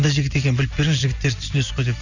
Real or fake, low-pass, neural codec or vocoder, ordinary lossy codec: real; 7.2 kHz; none; none